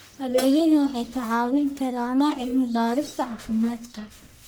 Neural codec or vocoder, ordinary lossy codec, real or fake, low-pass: codec, 44.1 kHz, 1.7 kbps, Pupu-Codec; none; fake; none